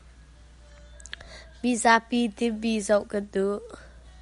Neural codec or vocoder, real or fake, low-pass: none; real; 10.8 kHz